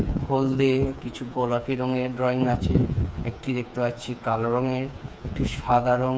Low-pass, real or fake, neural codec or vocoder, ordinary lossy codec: none; fake; codec, 16 kHz, 4 kbps, FreqCodec, smaller model; none